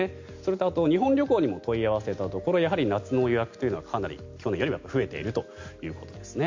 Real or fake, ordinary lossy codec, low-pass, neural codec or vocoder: real; MP3, 64 kbps; 7.2 kHz; none